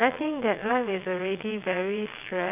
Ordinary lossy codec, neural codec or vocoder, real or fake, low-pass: none; vocoder, 22.05 kHz, 80 mel bands, WaveNeXt; fake; 3.6 kHz